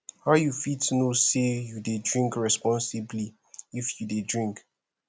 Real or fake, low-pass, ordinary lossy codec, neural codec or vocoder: real; none; none; none